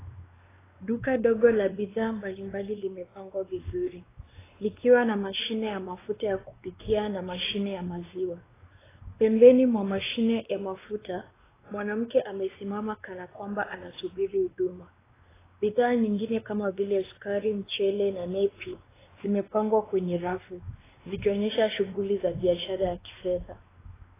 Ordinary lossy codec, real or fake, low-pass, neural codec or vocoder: AAC, 16 kbps; fake; 3.6 kHz; codec, 16 kHz, 2 kbps, X-Codec, WavLM features, trained on Multilingual LibriSpeech